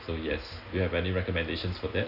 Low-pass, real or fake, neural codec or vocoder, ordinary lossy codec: 5.4 kHz; real; none; none